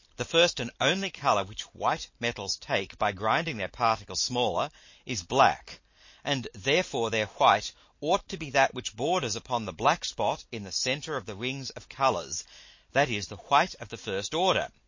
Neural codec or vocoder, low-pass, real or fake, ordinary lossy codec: none; 7.2 kHz; real; MP3, 32 kbps